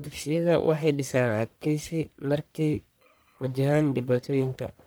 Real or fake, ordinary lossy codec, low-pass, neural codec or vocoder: fake; none; none; codec, 44.1 kHz, 1.7 kbps, Pupu-Codec